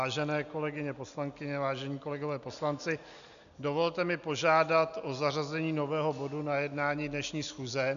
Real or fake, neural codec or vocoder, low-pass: real; none; 7.2 kHz